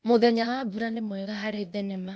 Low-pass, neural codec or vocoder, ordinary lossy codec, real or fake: none; codec, 16 kHz, 0.8 kbps, ZipCodec; none; fake